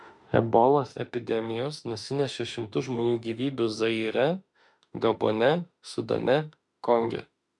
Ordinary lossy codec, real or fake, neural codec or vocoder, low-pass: MP3, 96 kbps; fake; autoencoder, 48 kHz, 32 numbers a frame, DAC-VAE, trained on Japanese speech; 10.8 kHz